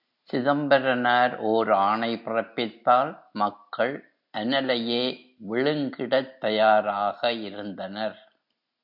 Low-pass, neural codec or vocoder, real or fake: 5.4 kHz; none; real